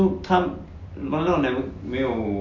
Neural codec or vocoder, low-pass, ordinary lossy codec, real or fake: none; 7.2 kHz; MP3, 48 kbps; real